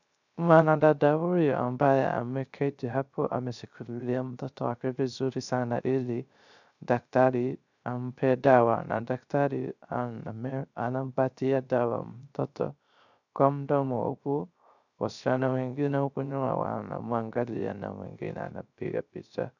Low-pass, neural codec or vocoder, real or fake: 7.2 kHz; codec, 16 kHz, 0.3 kbps, FocalCodec; fake